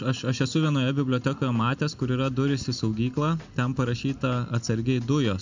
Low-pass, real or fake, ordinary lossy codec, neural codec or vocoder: 7.2 kHz; real; MP3, 64 kbps; none